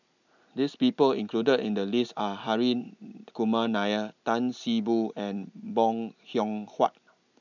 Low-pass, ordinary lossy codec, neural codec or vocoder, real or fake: 7.2 kHz; none; none; real